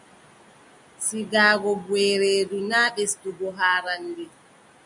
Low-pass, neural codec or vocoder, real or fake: 10.8 kHz; none; real